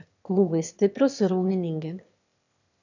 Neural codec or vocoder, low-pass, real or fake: autoencoder, 22.05 kHz, a latent of 192 numbers a frame, VITS, trained on one speaker; 7.2 kHz; fake